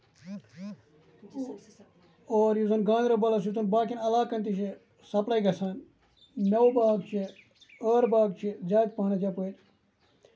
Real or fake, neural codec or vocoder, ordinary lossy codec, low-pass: real; none; none; none